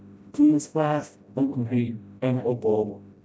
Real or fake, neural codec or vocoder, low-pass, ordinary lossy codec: fake; codec, 16 kHz, 0.5 kbps, FreqCodec, smaller model; none; none